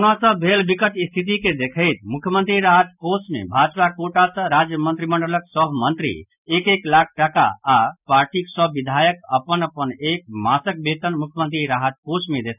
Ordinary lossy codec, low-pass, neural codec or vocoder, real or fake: none; 3.6 kHz; none; real